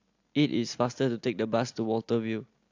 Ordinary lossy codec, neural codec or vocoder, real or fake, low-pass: AAC, 48 kbps; none; real; 7.2 kHz